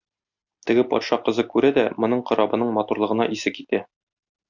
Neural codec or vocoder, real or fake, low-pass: none; real; 7.2 kHz